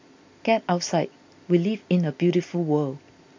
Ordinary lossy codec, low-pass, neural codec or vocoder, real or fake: MP3, 64 kbps; 7.2 kHz; none; real